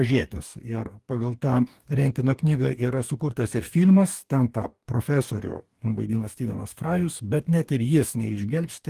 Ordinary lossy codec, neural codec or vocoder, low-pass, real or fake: Opus, 24 kbps; codec, 44.1 kHz, 2.6 kbps, DAC; 14.4 kHz; fake